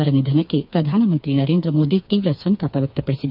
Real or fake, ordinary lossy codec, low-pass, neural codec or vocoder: fake; none; 5.4 kHz; codec, 16 kHz, 4 kbps, FreqCodec, smaller model